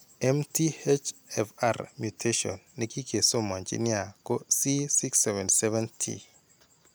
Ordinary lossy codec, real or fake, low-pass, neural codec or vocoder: none; real; none; none